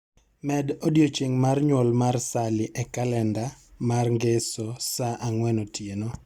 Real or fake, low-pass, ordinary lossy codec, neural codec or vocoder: real; 19.8 kHz; Opus, 64 kbps; none